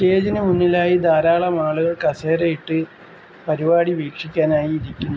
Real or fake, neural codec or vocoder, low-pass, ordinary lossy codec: real; none; none; none